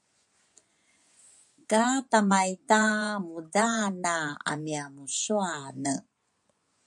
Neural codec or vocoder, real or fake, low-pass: vocoder, 24 kHz, 100 mel bands, Vocos; fake; 10.8 kHz